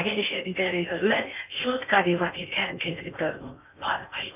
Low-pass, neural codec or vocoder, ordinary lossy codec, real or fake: 3.6 kHz; codec, 16 kHz in and 24 kHz out, 0.6 kbps, FocalCodec, streaming, 4096 codes; none; fake